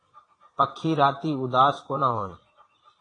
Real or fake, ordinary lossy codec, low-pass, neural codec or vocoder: fake; AAC, 32 kbps; 9.9 kHz; vocoder, 22.05 kHz, 80 mel bands, Vocos